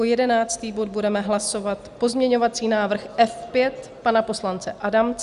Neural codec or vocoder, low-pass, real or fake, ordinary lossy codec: none; 10.8 kHz; real; Opus, 64 kbps